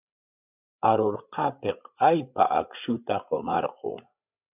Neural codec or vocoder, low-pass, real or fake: codec, 16 kHz, 8 kbps, FreqCodec, larger model; 3.6 kHz; fake